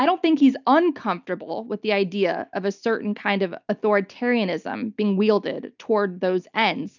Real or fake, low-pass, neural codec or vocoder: real; 7.2 kHz; none